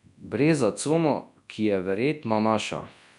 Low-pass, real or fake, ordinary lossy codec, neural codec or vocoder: 10.8 kHz; fake; none; codec, 24 kHz, 0.9 kbps, WavTokenizer, large speech release